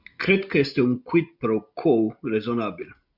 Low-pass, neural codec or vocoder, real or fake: 5.4 kHz; none; real